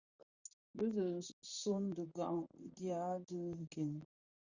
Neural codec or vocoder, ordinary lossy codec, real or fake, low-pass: vocoder, 44.1 kHz, 128 mel bands, Pupu-Vocoder; Opus, 64 kbps; fake; 7.2 kHz